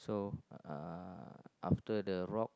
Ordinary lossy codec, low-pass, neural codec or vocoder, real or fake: none; none; none; real